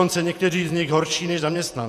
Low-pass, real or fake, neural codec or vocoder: 14.4 kHz; fake; vocoder, 48 kHz, 128 mel bands, Vocos